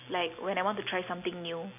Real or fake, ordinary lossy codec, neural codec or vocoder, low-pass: real; none; none; 3.6 kHz